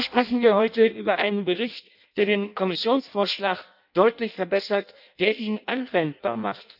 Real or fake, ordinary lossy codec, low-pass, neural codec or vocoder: fake; none; 5.4 kHz; codec, 16 kHz in and 24 kHz out, 0.6 kbps, FireRedTTS-2 codec